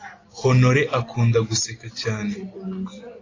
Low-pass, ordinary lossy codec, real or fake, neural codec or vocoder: 7.2 kHz; AAC, 32 kbps; real; none